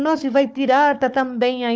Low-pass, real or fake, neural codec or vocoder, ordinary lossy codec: none; fake; codec, 16 kHz, 4 kbps, FunCodec, trained on Chinese and English, 50 frames a second; none